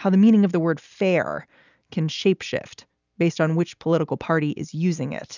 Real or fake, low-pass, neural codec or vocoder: real; 7.2 kHz; none